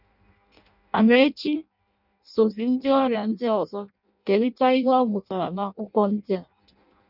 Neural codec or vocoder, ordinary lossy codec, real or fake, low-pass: codec, 16 kHz in and 24 kHz out, 0.6 kbps, FireRedTTS-2 codec; none; fake; 5.4 kHz